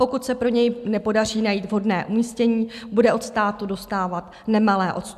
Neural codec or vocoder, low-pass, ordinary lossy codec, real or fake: none; 14.4 kHz; AAC, 96 kbps; real